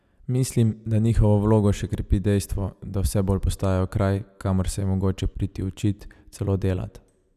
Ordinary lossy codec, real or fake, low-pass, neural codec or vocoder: none; real; 14.4 kHz; none